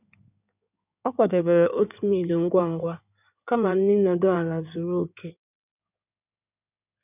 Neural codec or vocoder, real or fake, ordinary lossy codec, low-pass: codec, 16 kHz in and 24 kHz out, 2.2 kbps, FireRedTTS-2 codec; fake; none; 3.6 kHz